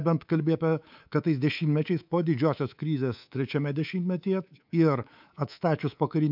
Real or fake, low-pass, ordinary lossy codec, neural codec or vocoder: fake; 5.4 kHz; MP3, 48 kbps; codec, 24 kHz, 3.1 kbps, DualCodec